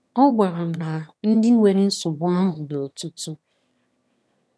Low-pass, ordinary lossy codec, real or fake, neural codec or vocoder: none; none; fake; autoencoder, 22.05 kHz, a latent of 192 numbers a frame, VITS, trained on one speaker